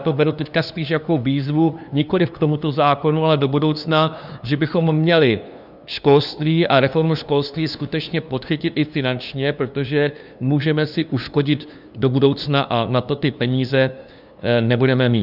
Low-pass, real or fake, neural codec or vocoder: 5.4 kHz; fake; codec, 16 kHz, 2 kbps, FunCodec, trained on LibriTTS, 25 frames a second